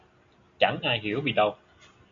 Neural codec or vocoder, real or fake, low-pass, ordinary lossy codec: none; real; 7.2 kHz; MP3, 64 kbps